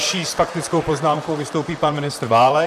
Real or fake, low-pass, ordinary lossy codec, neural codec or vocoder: fake; 14.4 kHz; AAC, 96 kbps; vocoder, 44.1 kHz, 128 mel bands, Pupu-Vocoder